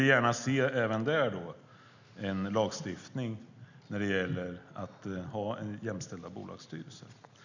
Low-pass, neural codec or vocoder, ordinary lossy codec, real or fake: 7.2 kHz; none; none; real